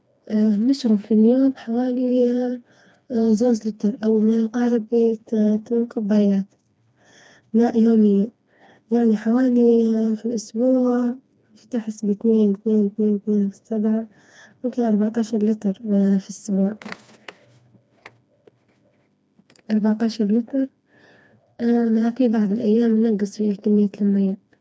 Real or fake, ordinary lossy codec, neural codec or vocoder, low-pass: fake; none; codec, 16 kHz, 2 kbps, FreqCodec, smaller model; none